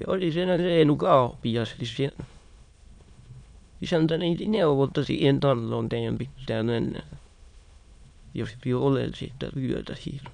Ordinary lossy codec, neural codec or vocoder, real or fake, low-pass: none; autoencoder, 22.05 kHz, a latent of 192 numbers a frame, VITS, trained on many speakers; fake; 9.9 kHz